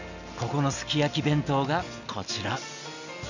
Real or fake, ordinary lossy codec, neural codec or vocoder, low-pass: real; none; none; 7.2 kHz